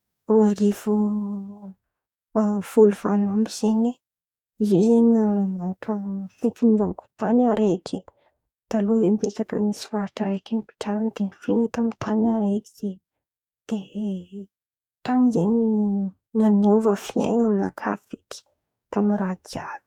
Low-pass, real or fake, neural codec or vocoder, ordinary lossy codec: 19.8 kHz; fake; codec, 44.1 kHz, 2.6 kbps, DAC; none